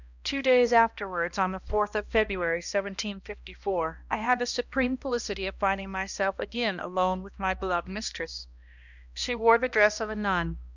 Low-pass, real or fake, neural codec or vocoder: 7.2 kHz; fake; codec, 16 kHz, 1 kbps, X-Codec, HuBERT features, trained on balanced general audio